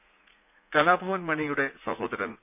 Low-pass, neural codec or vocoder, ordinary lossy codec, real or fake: 3.6 kHz; vocoder, 44.1 kHz, 80 mel bands, Vocos; none; fake